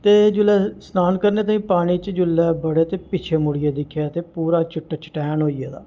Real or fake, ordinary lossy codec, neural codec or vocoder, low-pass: real; Opus, 24 kbps; none; 7.2 kHz